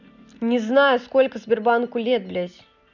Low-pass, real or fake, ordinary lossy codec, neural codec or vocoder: 7.2 kHz; real; none; none